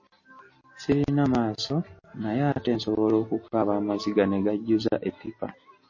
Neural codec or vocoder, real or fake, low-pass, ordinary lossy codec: none; real; 7.2 kHz; MP3, 32 kbps